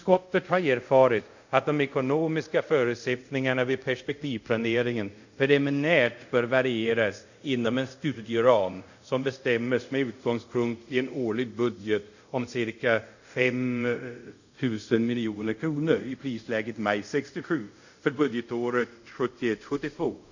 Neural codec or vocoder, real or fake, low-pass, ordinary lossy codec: codec, 24 kHz, 0.5 kbps, DualCodec; fake; 7.2 kHz; none